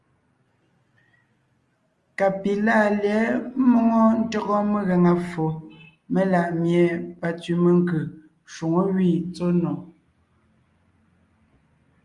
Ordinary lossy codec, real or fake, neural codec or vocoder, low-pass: Opus, 32 kbps; real; none; 10.8 kHz